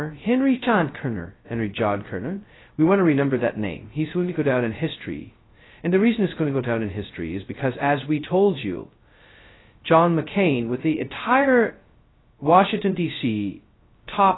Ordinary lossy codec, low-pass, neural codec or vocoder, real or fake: AAC, 16 kbps; 7.2 kHz; codec, 16 kHz, 0.2 kbps, FocalCodec; fake